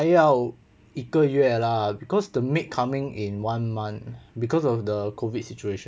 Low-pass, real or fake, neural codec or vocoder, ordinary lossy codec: none; real; none; none